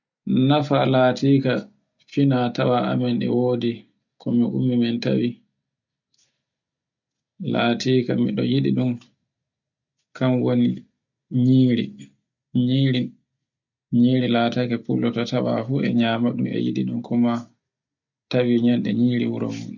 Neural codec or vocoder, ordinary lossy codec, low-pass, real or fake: none; none; 7.2 kHz; real